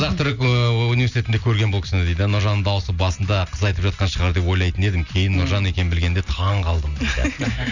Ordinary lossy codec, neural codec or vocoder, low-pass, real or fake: none; none; 7.2 kHz; real